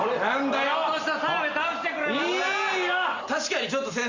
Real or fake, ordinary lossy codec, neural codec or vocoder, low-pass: real; Opus, 64 kbps; none; 7.2 kHz